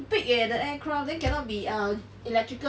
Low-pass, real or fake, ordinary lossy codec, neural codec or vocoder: none; real; none; none